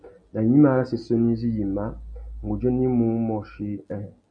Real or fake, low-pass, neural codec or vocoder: real; 9.9 kHz; none